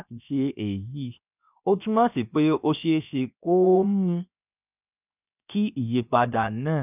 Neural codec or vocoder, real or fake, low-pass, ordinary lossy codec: codec, 16 kHz, 0.7 kbps, FocalCodec; fake; 3.6 kHz; none